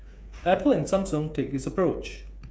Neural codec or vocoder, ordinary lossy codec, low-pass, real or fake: codec, 16 kHz, 8 kbps, FreqCodec, smaller model; none; none; fake